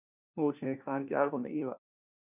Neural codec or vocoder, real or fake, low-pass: codec, 16 kHz, 1 kbps, FunCodec, trained on LibriTTS, 50 frames a second; fake; 3.6 kHz